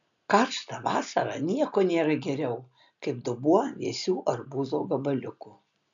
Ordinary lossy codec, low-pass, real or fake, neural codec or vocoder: MP3, 96 kbps; 7.2 kHz; real; none